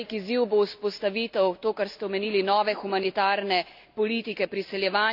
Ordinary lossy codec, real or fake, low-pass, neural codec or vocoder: none; real; 5.4 kHz; none